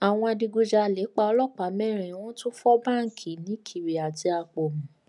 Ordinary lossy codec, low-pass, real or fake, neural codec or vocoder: none; 10.8 kHz; real; none